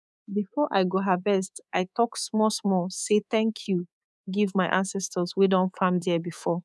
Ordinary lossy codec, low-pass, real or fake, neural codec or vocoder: none; none; fake; codec, 24 kHz, 3.1 kbps, DualCodec